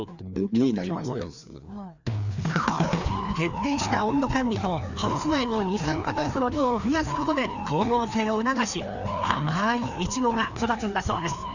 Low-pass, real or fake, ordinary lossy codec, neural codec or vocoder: 7.2 kHz; fake; none; codec, 16 kHz, 2 kbps, FreqCodec, larger model